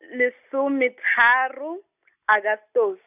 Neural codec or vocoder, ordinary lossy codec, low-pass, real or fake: none; none; 3.6 kHz; real